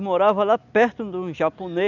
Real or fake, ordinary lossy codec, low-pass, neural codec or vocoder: real; none; 7.2 kHz; none